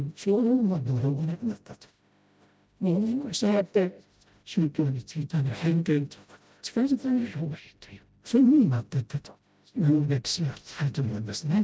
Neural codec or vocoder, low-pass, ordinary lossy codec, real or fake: codec, 16 kHz, 0.5 kbps, FreqCodec, smaller model; none; none; fake